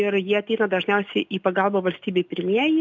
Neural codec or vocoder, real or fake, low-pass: none; real; 7.2 kHz